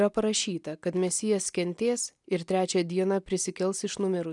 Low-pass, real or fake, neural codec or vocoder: 10.8 kHz; real; none